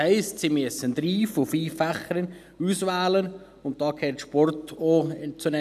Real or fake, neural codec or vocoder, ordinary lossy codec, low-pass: real; none; none; 14.4 kHz